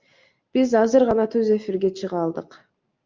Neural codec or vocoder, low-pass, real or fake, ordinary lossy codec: none; 7.2 kHz; real; Opus, 24 kbps